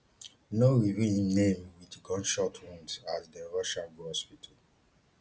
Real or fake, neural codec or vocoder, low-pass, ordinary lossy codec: real; none; none; none